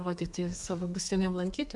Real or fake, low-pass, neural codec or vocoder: fake; 10.8 kHz; codec, 32 kHz, 1.9 kbps, SNAC